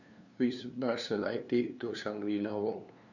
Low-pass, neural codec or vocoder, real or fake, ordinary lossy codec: 7.2 kHz; codec, 16 kHz, 2 kbps, FunCodec, trained on LibriTTS, 25 frames a second; fake; none